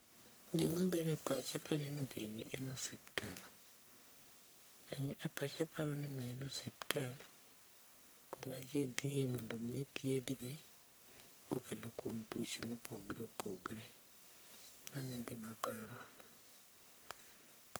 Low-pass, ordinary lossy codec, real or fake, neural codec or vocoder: none; none; fake; codec, 44.1 kHz, 1.7 kbps, Pupu-Codec